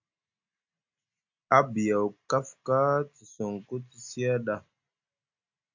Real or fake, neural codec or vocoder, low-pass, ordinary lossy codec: real; none; 7.2 kHz; AAC, 48 kbps